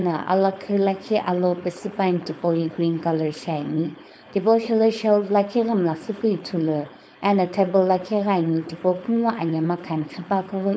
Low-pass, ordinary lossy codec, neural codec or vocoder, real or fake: none; none; codec, 16 kHz, 4.8 kbps, FACodec; fake